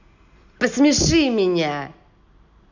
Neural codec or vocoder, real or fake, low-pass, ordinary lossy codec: none; real; 7.2 kHz; none